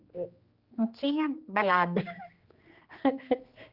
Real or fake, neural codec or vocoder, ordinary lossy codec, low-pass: fake; codec, 16 kHz, 2 kbps, X-Codec, HuBERT features, trained on general audio; Opus, 32 kbps; 5.4 kHz